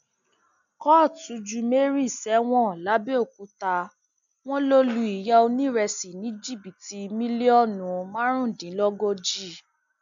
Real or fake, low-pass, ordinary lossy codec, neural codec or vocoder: real; 7.2 kHz; none; none